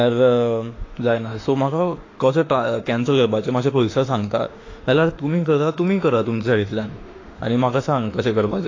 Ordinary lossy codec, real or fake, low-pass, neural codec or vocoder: AAC, 32 kbps; fake; 7.2 kHz; autoencoder, 48 kHz, 32 numbers a frame, DAC-VAE, trained on Japanese speech